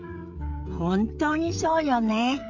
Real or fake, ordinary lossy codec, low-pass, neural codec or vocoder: fake; MP3, 64 kbps; 7.2 kHz; codec, 16 kHz, 16 kbps, FreqCodec, smaller model